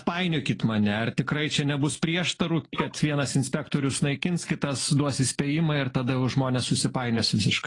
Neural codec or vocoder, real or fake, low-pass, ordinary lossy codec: none; real; 10.8 kHz; AAC, 32 kbps